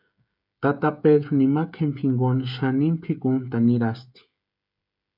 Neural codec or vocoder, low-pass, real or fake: codec, 16 kHz, 16 kbps, FreqCodec, smaller model; 5.4 kHz; fake